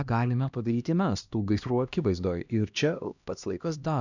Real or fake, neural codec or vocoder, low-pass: fake; codec, 16 kHz, 1 kbps, X-Codec, HuBERT features, trained on LibriSpeech; 7.2 kHz